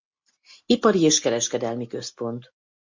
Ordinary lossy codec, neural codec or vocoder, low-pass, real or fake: MP3, 48 kbps; none; 7.2 kHz; real